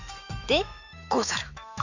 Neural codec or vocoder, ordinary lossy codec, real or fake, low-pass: autoencoder, 48 kHz, 128 numbers a frame, DAC-VAE, trained on Japanese speech; none; fake; 7.2 kHz